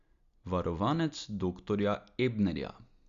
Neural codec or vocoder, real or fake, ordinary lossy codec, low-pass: none; real; Opus, 64 kbps; 7.2 kHz